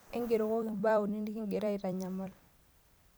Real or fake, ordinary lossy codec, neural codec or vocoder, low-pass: fake; none; vocoder, 44.1 kHz, 128 mel bands every 256 samples, BigVGAN v2; none